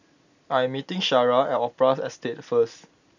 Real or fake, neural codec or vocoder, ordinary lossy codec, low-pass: real; none; none; 7.2 kHz